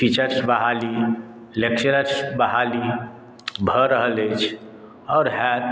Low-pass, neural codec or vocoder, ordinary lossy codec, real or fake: none; none; none; real